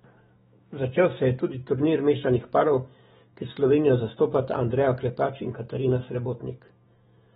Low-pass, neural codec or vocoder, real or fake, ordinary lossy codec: 19.8 kHz; autoencoder, 48 kHz, 128 numbers a frame, DAC-VAE, trained on Japanese speech; fake; AAC, 16 kbps